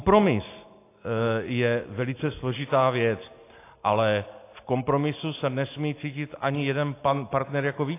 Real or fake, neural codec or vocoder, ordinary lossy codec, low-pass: real; none; AAC, 24 kbps; 3.6 kHz